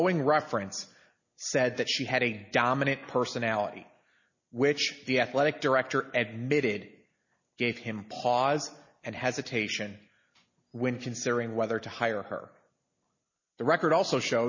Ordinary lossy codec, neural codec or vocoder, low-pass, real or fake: MP3, 32 kbps; none; 7.2 kHz; real